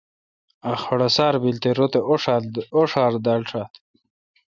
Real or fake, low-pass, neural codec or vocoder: real; 7.2 kHz; none